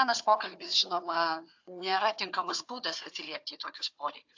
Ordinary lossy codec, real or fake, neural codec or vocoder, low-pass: AAC, 48 kbps; fake; codec, 16 kHz, 4 kbps, FunCodec, trained on Chinese and English, 50 frames a second; 7.2 kHz